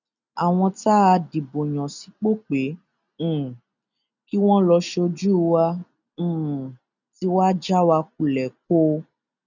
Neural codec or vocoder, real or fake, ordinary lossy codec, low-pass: none; real; none; 7.2 kHz